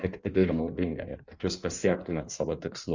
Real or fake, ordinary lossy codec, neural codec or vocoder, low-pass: fake; Opus, 64 kbps; codec, 16 kHz in and 24 kHz out, 1.1 kbps, FireRedTTS-2 codec; 7.2 kHz